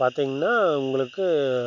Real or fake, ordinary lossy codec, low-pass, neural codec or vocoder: real; none; 7.2 kHz; none